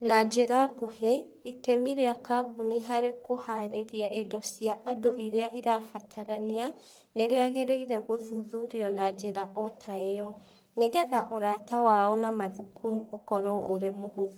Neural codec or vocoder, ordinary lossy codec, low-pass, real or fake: codec, 44.1 kHz, 1.7 kbps, Pupu-Codec; none; none; fake